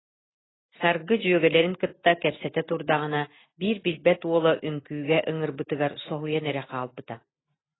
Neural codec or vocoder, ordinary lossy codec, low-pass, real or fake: none; AAC, 16 kbps; 7.2 kHz; real